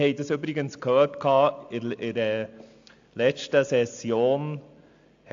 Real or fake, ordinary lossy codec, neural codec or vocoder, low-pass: real; AAC, 64 kbps; none; 7.2 kHz